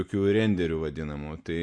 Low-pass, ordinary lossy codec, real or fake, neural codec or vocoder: 9.9 kHz; MP3, 64 kbps; real; none